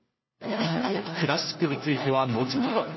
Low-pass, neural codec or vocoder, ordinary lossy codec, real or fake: 7.2 kHz; codec, 16 kHz, 1 kbps, FunCodec, trained on LibriTTS, 50 frames a second; MP3, 24 kbps; fake